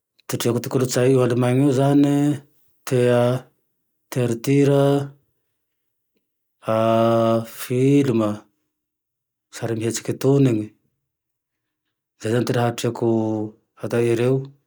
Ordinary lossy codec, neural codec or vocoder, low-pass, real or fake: none; none; none; real